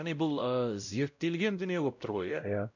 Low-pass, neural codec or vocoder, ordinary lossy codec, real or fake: 7.2 kHz; codec, 16 kHz, 0.5 kbps, X-Codec, WavLM features, trained on Multilingual LibriSpeech; none; fake